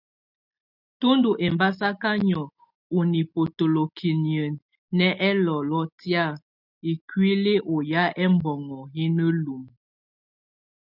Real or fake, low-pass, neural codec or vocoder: real; 5.4 kHz; none